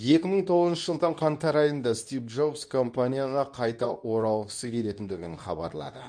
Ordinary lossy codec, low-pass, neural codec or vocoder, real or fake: none; 9.9 kHz; codec, 24 kHz, 0.9 kbps, WavTokenizer, medium speech release version 2; fake